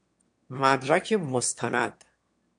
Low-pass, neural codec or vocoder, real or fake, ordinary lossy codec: 9.9 kHz; autoencoder, 22.05 kHz, a latent of 192 numbers a frame, VITS, trained on one speaker; fake; MP3, 64 kbps